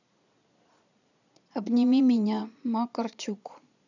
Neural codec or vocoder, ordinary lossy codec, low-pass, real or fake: vocoder, 22.05 kHz, 80 mel bands, Vocos; none; 7.2 kHz; fake